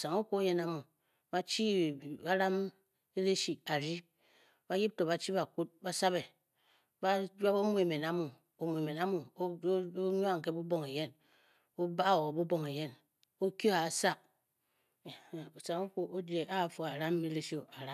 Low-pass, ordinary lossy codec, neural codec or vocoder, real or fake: 14.4 kHz; none; none; real